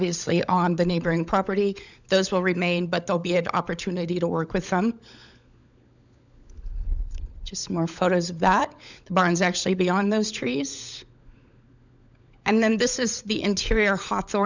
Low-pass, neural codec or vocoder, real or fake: 7.2 kHz; codec, 16 kHz, 16 kbps, FunCodec, trained on LibriTTS, 50 frames a second; fake